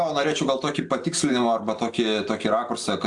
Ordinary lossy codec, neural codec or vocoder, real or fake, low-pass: AAC, 64 kbps; none; real; 10.8 kHz